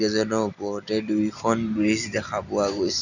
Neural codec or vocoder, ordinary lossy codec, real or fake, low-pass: none; none; real; 7.2 kHz